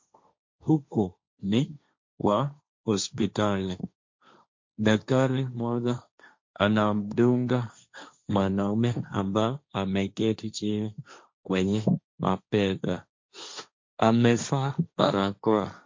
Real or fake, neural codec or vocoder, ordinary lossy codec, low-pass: fake; codec, 16 kHz, 1.1 kbps, Voila-Tokenizer; MP3, 48 kbps; 7.2 kHz